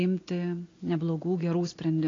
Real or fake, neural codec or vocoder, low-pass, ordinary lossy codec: real; none; 7.2 kHz; AAC, 32 kbps